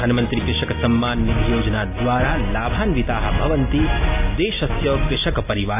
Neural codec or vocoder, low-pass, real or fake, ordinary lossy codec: none; 3.6 kHz; real; AAC, 24 kbps